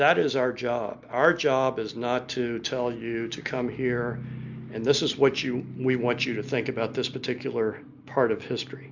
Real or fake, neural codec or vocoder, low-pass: real; none; 7.2 kHz